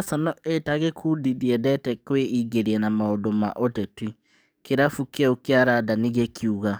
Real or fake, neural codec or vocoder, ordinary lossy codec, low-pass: fake; codec, 44.1 kHz, 7.8 kbps, DAC; none; none